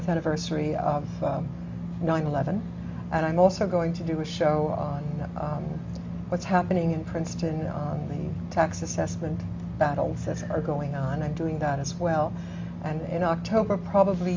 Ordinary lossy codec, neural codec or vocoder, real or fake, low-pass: MP3, 48 kbps; none; real; 7.2 kHz